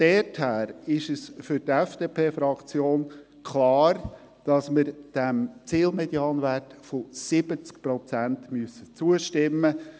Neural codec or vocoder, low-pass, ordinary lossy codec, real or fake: none; none; none; real